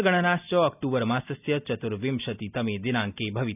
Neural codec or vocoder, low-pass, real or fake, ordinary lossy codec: none; 3.6 kHz; real; none